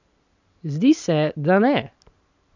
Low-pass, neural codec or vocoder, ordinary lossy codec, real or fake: 7.2 kHz; none; none; real